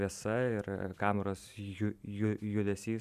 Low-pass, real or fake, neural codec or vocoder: 14.4 kHz; fake; vocoder, 44.1 kHz, 128 mel bands every 512 samples, BigVGAN v2